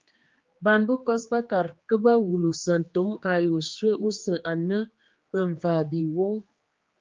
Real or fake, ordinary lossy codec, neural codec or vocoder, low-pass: fake; Opus, 32 kbps; codec, 16 kHz, 2 kbps, X-Codec, HuBERT features, trained on general audio; 7.2 kHz